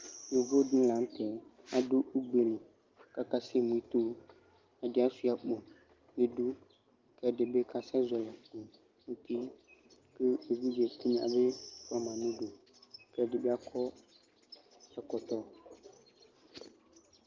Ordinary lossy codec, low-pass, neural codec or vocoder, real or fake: Opus, 32 kbps; 7.2 kHz; none; real